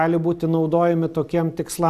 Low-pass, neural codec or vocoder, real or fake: 14.4 kHz; none; real